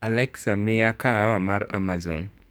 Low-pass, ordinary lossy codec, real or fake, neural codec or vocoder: none; none; fake; codec, 44.1 kHz, 2.6 kbps, SNAC